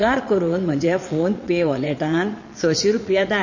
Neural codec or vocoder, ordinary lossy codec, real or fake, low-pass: vocoder, 22.05 kHz, 80 mel bands, Vocos; MP3, 32 kbps; fake; 7.2 kHz